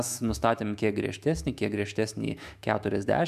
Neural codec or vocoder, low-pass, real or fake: autoencoder, 48 kHz, 128 numbers a frame, DAC-VAE, trained on Japanese speech; 14.4 kHz; fake